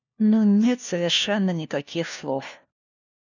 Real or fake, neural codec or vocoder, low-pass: fake; codec, 16 kHz, 1 kbps, FunCodec, trained on LibriTTS, 50 frames a second; 7.2 kHz